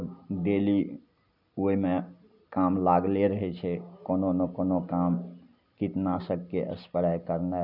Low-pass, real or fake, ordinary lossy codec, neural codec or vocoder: 5.4 kHz; real; none; none